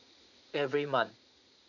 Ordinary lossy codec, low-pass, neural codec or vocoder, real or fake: none; 7.2 kHz; vocoder, 44.1 kHz, 80 mel bands, Vocos; fake